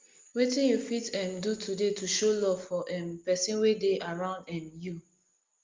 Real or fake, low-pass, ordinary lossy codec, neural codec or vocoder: real; 7.2 kHz; Opus, 32 kbps; none